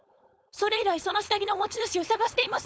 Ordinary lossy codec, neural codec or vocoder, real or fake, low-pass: none; codec, 16 kHz, 4.8 kbps, FACodec; fake; none